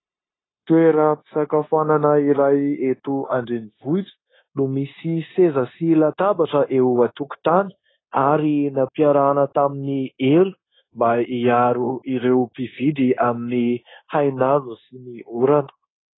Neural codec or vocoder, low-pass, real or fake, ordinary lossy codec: codec, 16 kHz, 0.9 kbps, LongCat-Audio-Codec; 7.2 kHz; fake; AAC, 16 kbps